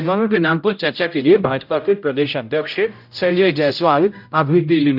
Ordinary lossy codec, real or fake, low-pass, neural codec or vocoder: none; fake; 5.4 kHz; codec, 16 kHz, 0.5 kbps, X-Codec, HuBERT features, trained on general audio